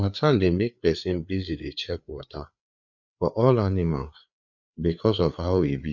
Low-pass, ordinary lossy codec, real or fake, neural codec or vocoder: 7.2 kHz; none; fake; codec, 16 kHz, 4 kbps, FreqCodec, larger model